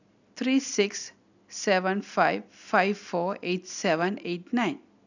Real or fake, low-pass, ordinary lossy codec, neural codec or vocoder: real; 7.2 kHz; none; none